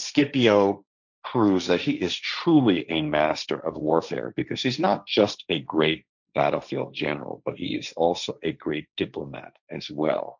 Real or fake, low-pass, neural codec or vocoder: fake; 7.2 kHz; codec, 16 kHz, 1.1 kbps, Voila-Tokenizer